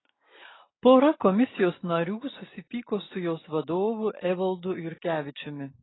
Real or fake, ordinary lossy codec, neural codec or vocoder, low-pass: real; AAC, 16 kbps; none; 7.2 kHz